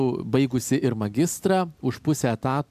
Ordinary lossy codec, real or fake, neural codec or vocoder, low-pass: AAC, 96 kbps; real; none; 14.4 kHz